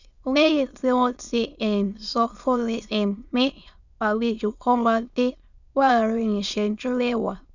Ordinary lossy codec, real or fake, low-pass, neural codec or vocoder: none; fake; 7.2 kHz; autoencoder, 22.05 kHz, a latent of 192 numbers a frame, VITS, trained on many speakers